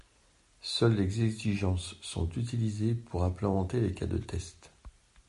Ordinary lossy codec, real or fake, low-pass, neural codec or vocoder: MP3, 48 kbps; real; 14.4 kHz; none